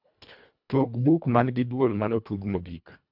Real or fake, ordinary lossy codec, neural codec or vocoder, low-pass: fake; none; codec, 24 kHz, 1.5 kbps, HILCodec; 5.4 kHz